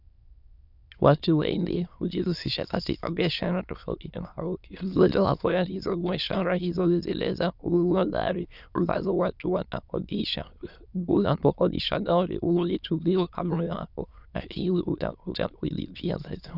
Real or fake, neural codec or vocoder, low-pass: fake; autoencoder, 22.05 kHz, a latent of 192 numbers a frame, VITS, trained on many speakers; 5.4 kHz